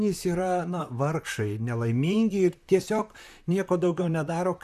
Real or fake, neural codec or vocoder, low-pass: fake; vocoder, 44.1 kHz, 128 mel bands, Pupu-Vocoder; 14.4 kHz